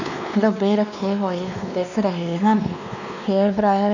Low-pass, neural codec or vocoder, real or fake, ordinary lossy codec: 7.2 kHz; codec, 16 kHz, 2 kbps, X-Codec, WavLM features, trained on Multilingual LibriSpeech; fake; none